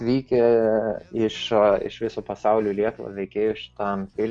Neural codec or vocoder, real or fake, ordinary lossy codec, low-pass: none; real; MP3, 96 kbps; 9.9 kHz